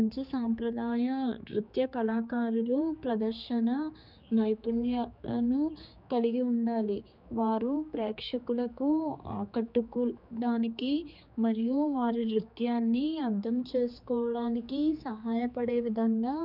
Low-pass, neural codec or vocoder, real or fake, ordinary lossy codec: 5.4 kHz; codec, 16 kHz, 4 kbps, X-Codec, HuBERT features, trained on general audio; fake; none